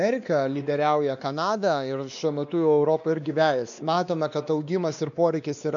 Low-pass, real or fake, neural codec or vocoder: 7.2 kHz; fake; codec, 16 kHz, 2 kbps, X-Codec, WavLM features, trained on Multilingual LibriSpeech